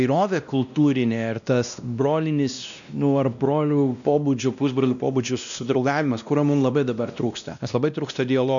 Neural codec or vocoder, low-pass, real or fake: codec, 16 kHz, 1 kbps, X-Codec, WavLM features, trained on Multilingual LibriSpeech; 7.2 kHz; fake